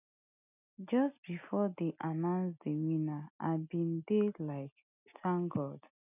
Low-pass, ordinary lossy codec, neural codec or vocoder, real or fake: 3.6 kHz; none; none; real